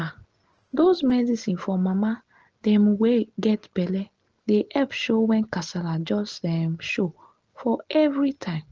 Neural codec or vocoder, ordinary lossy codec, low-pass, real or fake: none; Opus, 16 kbps; 7.2 kHz; real